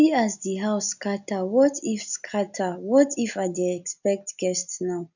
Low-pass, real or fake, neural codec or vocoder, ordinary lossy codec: 7.2 kHz; real; none; none